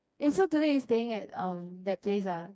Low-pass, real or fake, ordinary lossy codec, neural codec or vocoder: none; fake; none; codec, 16 kHz, 2 kbps, FreqCodec, smaller model